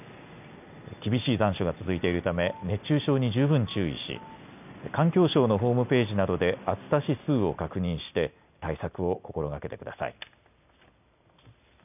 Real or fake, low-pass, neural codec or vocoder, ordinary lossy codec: real; 3.6 kHz; none; none